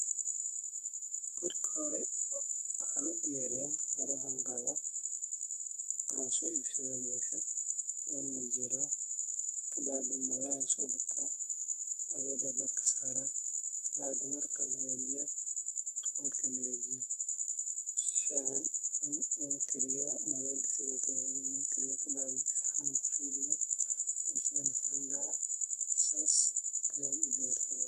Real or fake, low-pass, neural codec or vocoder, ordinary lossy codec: fake; 14.4 kHz; codec, 44.1 kHz, 2.6 kbps, SNAC; none